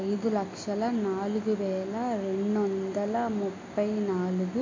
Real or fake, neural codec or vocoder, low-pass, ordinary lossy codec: real; none; 7.2 kHz; AAC, 32 kbps